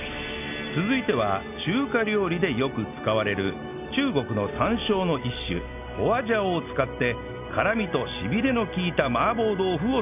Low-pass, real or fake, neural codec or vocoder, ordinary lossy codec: 3.6 kHz; real; none; none